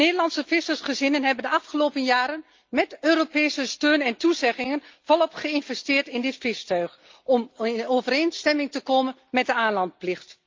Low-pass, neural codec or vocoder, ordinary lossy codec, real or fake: 7.2 kHz; none; Opus, 24 kbps; real